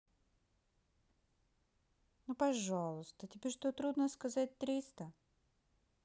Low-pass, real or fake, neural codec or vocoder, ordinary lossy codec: none; real; none; none